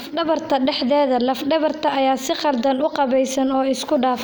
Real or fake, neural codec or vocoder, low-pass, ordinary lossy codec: real; none; none; none